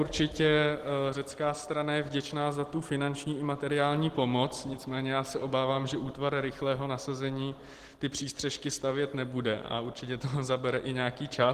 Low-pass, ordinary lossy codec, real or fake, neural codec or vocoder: 14.4 kHz; Opus, 16 kbps; real; none